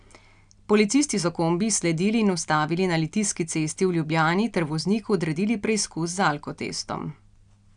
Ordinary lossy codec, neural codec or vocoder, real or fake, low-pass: none; none; real; 9.9 kHz